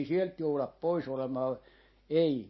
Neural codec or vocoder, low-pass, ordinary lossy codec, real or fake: none; 7.2 kHz; MP3, 24 kbps; real